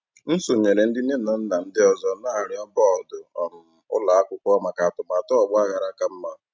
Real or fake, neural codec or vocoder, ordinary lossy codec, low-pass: real; none; none; none